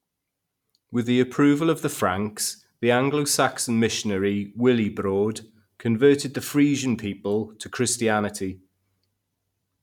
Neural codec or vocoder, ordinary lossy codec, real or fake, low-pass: none; none; real; 19.8 kHz